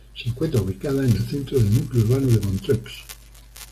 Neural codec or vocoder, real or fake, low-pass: none; real; 14.4 kHz